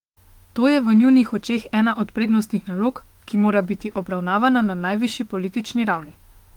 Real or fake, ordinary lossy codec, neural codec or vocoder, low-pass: fake; Opus, 24 kbps; autoencoder, 48 kHz, 32 numbers a frame, DAC-VAE, trained on Japanese speech; 19.8 kHz